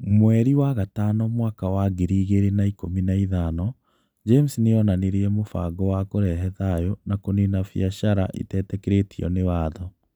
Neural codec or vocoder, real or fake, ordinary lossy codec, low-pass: none; real; none; none